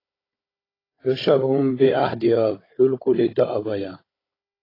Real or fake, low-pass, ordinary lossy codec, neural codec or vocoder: fake; 5.4 kHz; AAC, 24 kbps; codec, 16 kHz, 16 kbps, FunCodec, trained on Chinese and English, 50 frames a second